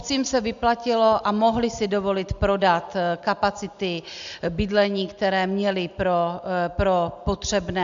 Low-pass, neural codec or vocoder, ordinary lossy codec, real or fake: 7.2 kHz; none; MP3, 64 kbps; real